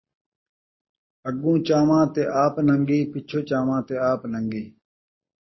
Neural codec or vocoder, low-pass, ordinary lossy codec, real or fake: none; 7.2 kHz; MP3, 24 kbps; real